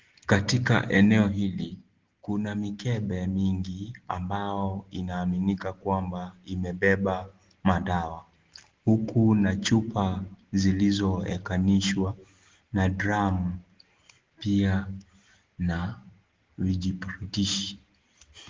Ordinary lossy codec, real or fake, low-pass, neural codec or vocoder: Opus, 16 kbps; real; 7.2 kHz; none